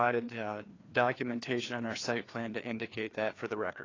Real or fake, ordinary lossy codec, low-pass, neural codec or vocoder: fake; AAC, 32 kbps; 7.2 kHz; codec, 16 kHz, 2 kbps, FreqCodec, larger model